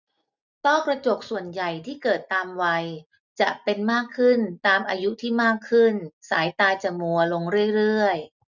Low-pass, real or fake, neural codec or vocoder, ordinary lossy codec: 7.2 kHz; real; none; none